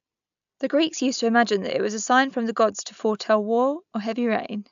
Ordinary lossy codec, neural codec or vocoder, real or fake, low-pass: AAC, 96 kbps; none; real; 7.2 kHz